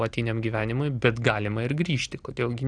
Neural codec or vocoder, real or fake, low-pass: none; real; 9.9 kHz